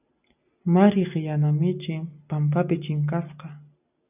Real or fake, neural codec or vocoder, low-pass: real; none; 3.6 kHz